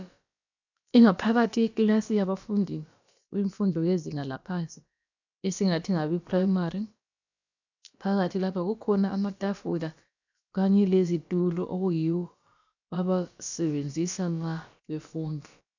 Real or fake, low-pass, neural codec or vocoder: fake; 7.2 kHz; codec, 16 kHz, about 1 kbps, DyCAST, with the encoder's durations